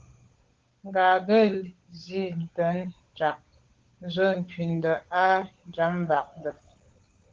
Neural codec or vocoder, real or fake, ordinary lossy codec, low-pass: codec, 16 kHz, 16 kbps, FunCodec, trained on LibriTTS, 50 frames a second; fake; Opus, 16 kbps; 7.2 kHz